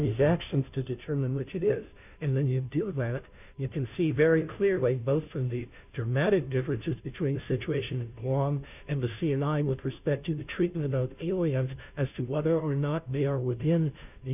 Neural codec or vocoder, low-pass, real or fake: codec, 16 kHz, 0.5 kbps, FunCodec, trained on Chinese and English, 25 frames a second; 3.6 kHz; fake